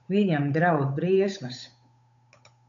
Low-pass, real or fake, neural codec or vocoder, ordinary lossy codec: 7.2 kHz; fake; codec, 16 kHz, 8 kbps, FunCodec, trained on Chinese and English, 25 frames a second; MP3, 96 kbps